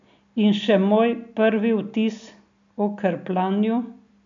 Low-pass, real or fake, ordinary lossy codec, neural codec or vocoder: 7.2 kHz; real; AAC, 64 kbps; none